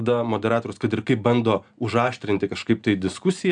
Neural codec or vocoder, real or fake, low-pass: none; real; 9.9 kHz